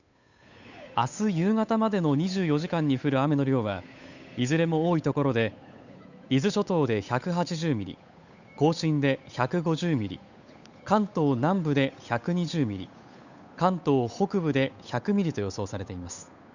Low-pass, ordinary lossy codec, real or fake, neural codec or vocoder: 7.2 kHz; none; fake; codec, 16 kHz, 8 kbps, FunCodec, trained on Chinese and English, 25 frames a second